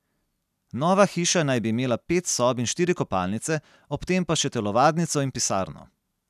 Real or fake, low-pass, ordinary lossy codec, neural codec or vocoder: real; 14.4 kHz; none; none